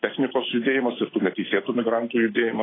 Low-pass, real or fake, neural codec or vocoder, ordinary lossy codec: 7.2 kHz; real; none; AAC, 16 kbps